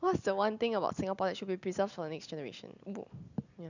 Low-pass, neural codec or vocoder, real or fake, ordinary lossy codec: 7.2 kHz; none; real; none